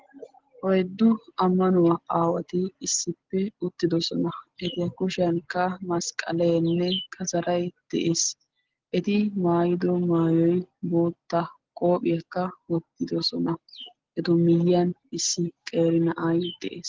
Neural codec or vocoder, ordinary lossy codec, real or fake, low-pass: none; Opus, 16 kbps; real; 7.2 kHz